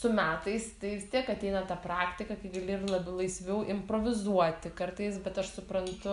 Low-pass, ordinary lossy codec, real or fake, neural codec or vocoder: 10.8 kHz; AAC, 96 kbps; real; none